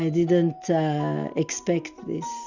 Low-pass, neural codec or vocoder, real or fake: 7.2 kHz; none; real